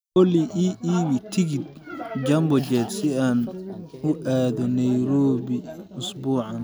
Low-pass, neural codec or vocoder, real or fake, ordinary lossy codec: none; none; real; none